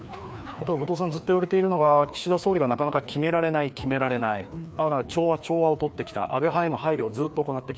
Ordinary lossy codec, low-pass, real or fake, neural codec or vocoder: none; none; fake; codec, 16 kHz, 2 kbps, FreqCodec, larger model